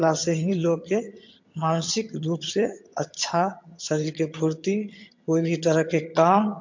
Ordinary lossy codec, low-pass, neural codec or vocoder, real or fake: MP3, 48 kbps; 7.2 kHz; vocoder, 22.05 kHz, 80 mel bands, HiFi-GAN; fake